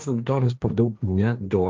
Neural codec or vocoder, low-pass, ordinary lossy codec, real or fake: codec, 16 kHz, 1.1 kbps, Voila-Tokenizer; 7.2 kHz; Opus, 32 kbps; fake